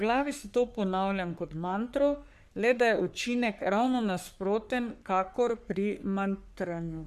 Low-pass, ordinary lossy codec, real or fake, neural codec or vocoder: 14.4 kHz; none; fake; codec, 44.1 kHz, 3.4 kbps, Pupu-Codec